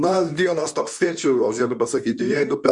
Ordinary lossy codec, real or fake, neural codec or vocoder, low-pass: MP3, 96 kbps; fake; codec, 24 kHz, 0.9 kbps, WavTokenizer, medium speech release version 2; 10.8 kHz